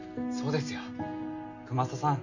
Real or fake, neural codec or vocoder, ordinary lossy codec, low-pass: real; none; none; 7.2 kHz